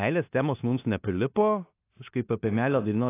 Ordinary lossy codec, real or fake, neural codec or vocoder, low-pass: AAC, 24 kbps; fake; codec, 16 kHz in and 24 kHz out, 0.9 kbps, LongCat-Audio-Codec, four codebook decoder; 3.6 kHz